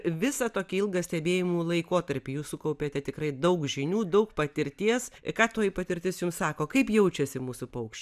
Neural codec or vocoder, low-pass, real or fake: none; 14.4 kHz; real